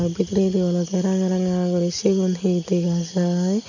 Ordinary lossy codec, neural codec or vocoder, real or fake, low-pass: none; none; real; 7.2 kHz